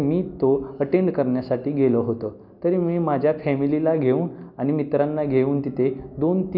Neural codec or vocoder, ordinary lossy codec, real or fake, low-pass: none; none; real; 5.4 kHz